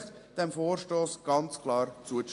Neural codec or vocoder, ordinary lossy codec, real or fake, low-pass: none; AAC, 48 kbps; real; 10.8 kHz